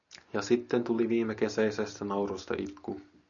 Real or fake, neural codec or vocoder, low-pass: real; none; 7.2 kHz